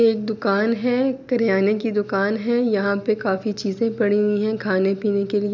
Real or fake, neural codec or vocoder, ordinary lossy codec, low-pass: real; none; none; 7.2 kHz